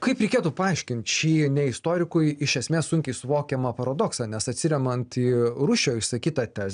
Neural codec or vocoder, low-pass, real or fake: none; 9.9 kHz; real